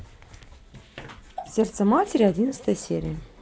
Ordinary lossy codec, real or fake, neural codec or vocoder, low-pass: none; real; none; none